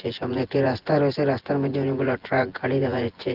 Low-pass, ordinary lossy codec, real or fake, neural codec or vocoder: 5.4 kHz; Opus, 16 kbps; fake; vocoder, 24 kHz, 100 mel bands, Vocos